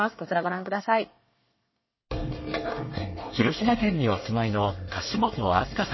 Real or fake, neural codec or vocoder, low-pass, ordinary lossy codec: fake; codec, 24 kHz, 1 kbps, SNAC; 7.2 kHz; MP3, 24 kbps